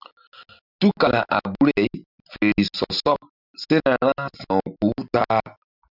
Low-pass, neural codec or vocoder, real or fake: 5.4 kHz; none; real